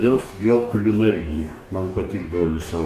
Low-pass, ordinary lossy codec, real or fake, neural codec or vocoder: 14.4 kHz; Opus, 64 kbps; fake; codec, 44.1 kHz, 2.6 kbps, DAC